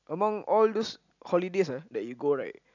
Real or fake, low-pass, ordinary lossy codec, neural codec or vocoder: real; 7.2 kHz; none; none